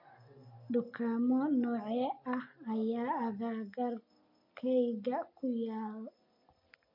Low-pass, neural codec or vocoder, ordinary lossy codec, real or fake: 5.4 kHz; none; MP3, 32 kbps; real